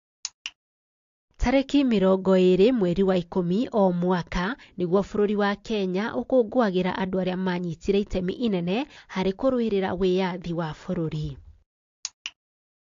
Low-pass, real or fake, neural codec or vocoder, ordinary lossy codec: 7.2 kHz; real; none; AAC, 48 kbps